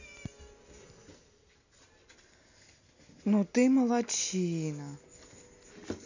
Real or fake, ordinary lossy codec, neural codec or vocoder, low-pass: real; none; none; 7.2 kHz